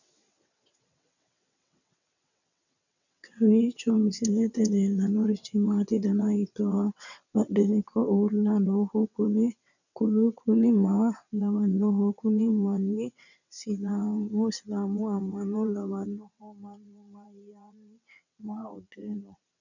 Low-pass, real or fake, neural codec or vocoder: 7.2 kHz; fake; vocoder, 22.05 kHz, 80 mel bands, WaveNeXt